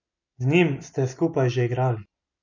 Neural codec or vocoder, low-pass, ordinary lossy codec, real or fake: none; 7.2 kHz; none; real